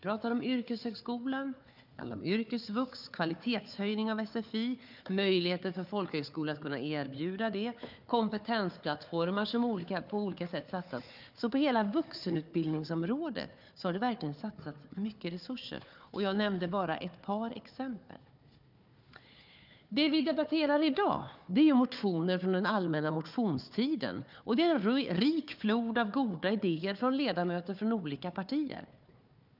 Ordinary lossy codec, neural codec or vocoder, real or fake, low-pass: none; codec, 16 kHz, 4 kbps, FunCodec, trained on Chinese and English, 50 frames a second; fake; 5.4 kHz